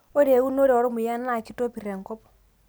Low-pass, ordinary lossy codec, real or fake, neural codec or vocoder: none; none; real; none